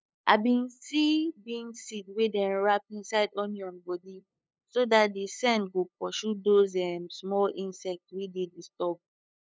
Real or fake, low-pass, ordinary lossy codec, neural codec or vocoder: fake; none; none; codec, 16 kHz, 8 kbps, FunCodec, trained on LibriTTS, 25 frames a second